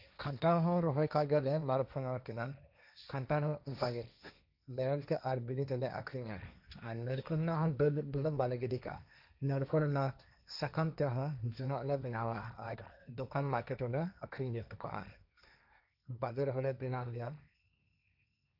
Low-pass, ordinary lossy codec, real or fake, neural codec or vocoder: 5.4 kHz; none; fake; codec, 16 kHz, 1.1 kbps, Voila-Tokenizer